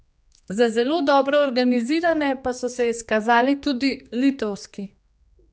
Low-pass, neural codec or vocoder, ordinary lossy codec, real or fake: none; codec, 16 kHz, 2 kbps, X-Codec, HuBERT features, trained on general audio; none; fake